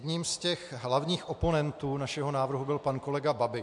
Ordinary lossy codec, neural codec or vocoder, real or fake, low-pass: MP3, 64 kbps; none; real; 10.8 kHz